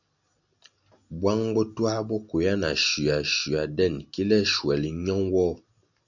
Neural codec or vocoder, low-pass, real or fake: none; 7.2 kHz; real